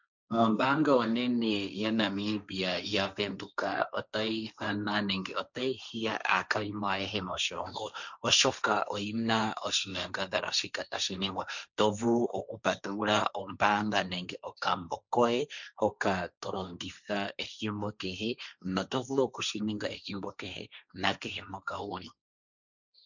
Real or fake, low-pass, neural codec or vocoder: fake; 7.2 kHz; codec, 16 kHz, 1.1 kbps, Voila-Tokenizer